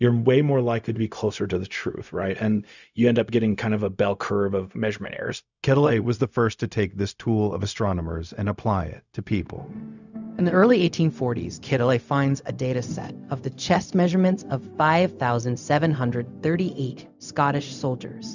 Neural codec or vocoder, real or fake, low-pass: codec, 16 kHz, 0.4 kbps, LongCat-Audio-Codec; fake; 7.2 kHz